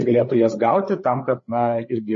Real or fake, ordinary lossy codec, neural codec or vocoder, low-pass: fake; MP3, 32 kbps; codec, 16 kHz, 4 kbps, FreqCodec, larger model; 7.2 kHz